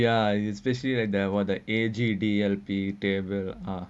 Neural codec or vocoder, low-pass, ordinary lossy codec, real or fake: none; none; none; real